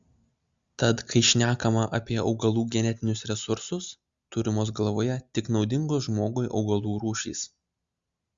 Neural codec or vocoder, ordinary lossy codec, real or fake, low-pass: none; Opus, 64 kbps; real; 7.2 kHz